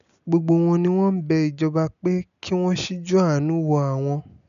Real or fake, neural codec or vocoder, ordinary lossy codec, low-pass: real; none; none; 7.2 kHz